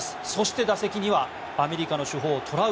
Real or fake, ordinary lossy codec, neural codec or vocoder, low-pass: real; none; none; none